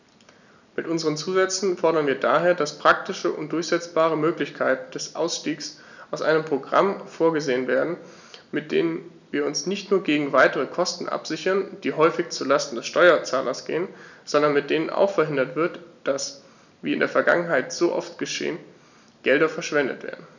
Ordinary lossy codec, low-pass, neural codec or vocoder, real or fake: none; 7.2 kHz; none; real